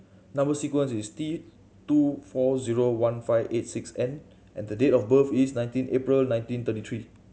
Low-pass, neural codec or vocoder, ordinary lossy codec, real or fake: none; none; none; real